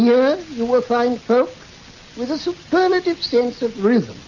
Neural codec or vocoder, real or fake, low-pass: none; real; 7.2 kHz